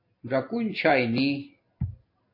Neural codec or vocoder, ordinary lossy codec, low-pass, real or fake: none; MP3, 32 kbps; 5.4 kHz; real